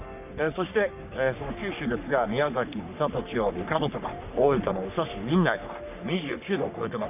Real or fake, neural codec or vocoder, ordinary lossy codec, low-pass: fake; codec, 44.1 kHz, 3.4 kbps, Pupu-Codec; none; 3.6 kHz